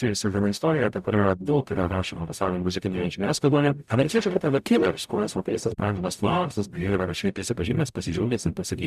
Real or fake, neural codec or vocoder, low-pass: fake; codec, 44.1 kHz, 0.9 kbps, DAC; 14.4 kHz